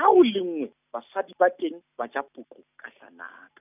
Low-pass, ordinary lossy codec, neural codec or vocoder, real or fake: 3.6 kHz; none; none; real